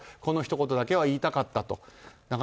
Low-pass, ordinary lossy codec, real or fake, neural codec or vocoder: none; none; real; none